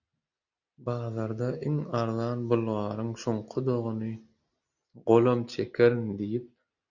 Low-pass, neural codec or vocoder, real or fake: 7.2 kHz; none; real